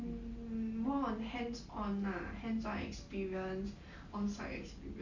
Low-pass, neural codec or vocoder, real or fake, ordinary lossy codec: 7.2 kHz; none; real; none